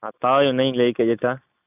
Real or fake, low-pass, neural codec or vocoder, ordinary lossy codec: real; 3.6 kHz; none; none